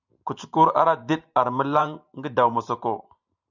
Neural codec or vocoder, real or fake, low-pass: vocoder, 44.1 kHz, 128 mel bands every 512 samples, BigVGAN v2; fake; 7.2 kHz